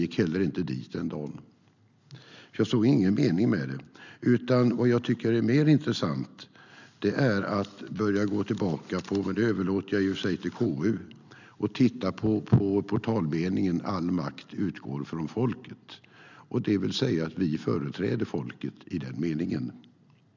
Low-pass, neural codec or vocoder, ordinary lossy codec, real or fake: 7.2 kHz; none; none; real